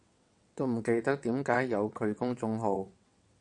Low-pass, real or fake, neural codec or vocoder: 9.9 kHz; fake; vocoder, 22.05 kHz, 80 mel bands, WaveNeXt